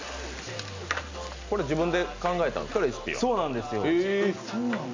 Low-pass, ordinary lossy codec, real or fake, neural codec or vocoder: 7.2 kHz; MP3, 64 kbps; real; none